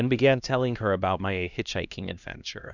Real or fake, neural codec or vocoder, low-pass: fake; codec, 16 kHz, 1 kbps, X-Codec, HuBERT features, trained on LibriSpeech; 7.2 kHz